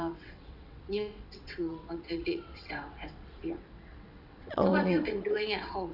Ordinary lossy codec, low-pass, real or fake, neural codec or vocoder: none; 5.4 kHz; fake; vocoder, 22.05 kHz, 80 mel bands, WaveNeXt